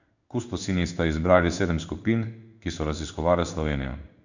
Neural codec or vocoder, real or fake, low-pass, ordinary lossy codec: codec, 16 kHz in and 24 kHz out, 1 kbps, XY-Tokenizer; fake; 7.2 kHz; none